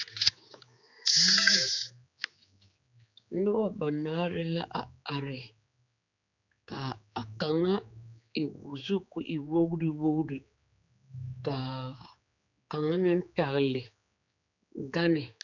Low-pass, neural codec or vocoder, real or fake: 7.2 kHz; codec, 16 kHz, 4 kbps, X-Codec, HuBERT features, trained on general audio; fake